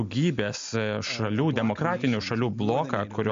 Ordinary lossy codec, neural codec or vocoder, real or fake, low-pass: MP3, 48 kbps; none; real; 7.2 kHz